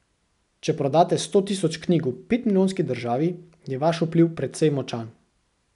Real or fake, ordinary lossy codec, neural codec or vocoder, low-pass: real; none; none; 10.8 kHz